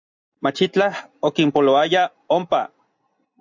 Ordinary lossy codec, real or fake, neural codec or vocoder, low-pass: MP3, 64 kbps; real; none; 7.2 kHz